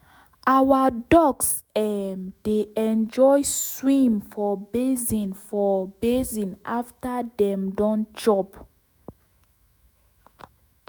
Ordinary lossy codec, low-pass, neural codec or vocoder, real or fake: none; none; autoencoder, 48 kHz, 128 numbers a frame, DAC-VAE, trained on Japanese speech; fake